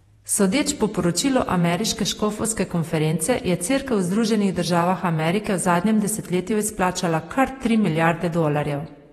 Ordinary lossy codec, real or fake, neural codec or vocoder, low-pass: AAC, 32 kbps; fake; vocoder, 48 kHz, 128 mel bands, Vocos; 19.8 kHz